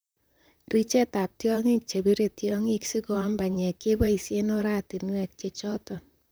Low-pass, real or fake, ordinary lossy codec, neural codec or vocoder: none; fake; none; vocoder, 44.1 kHz, 128 mel bands, Pupu-Vocoder